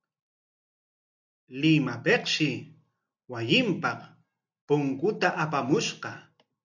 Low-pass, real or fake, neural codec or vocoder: 7.2 kHz; real; none